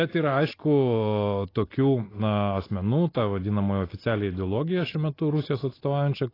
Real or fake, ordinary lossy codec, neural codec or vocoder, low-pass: real; AAC, 24 kbps; none; 5.4 kHz